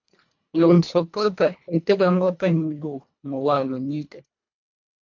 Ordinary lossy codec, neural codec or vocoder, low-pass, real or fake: MP3, 48 kbps; codec, 24 kHz, 1.5 kbps, HILCodec; 7.2 kHz; fake